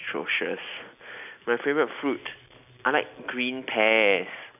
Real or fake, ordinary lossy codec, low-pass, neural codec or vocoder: real; none; 3.6 kHz; none